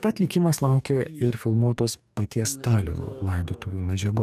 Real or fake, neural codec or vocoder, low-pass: fake; codec, 44.1 kHz, 2.6 kbps, DAC; 14.4 kHz